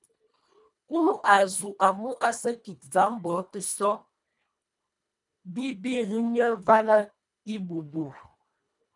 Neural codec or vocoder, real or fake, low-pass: codec, 24 kHz, 1.5 kbps, HILCodec; fake; 10.8 kHz